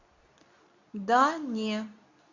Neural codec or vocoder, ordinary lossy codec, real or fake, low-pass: codec, 24 kHz, 0.9 kbps, WavTokenizer, medium speech release version 2; Opus, 64 kbps; fake; 7.2 kHz